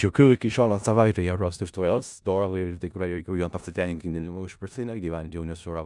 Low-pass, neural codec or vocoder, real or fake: 10.8 kHz; codec, 16 kHz in and 24 kHz out, 0.4 kbps, LongCat-Audio-Codec, four codebook decoder; fake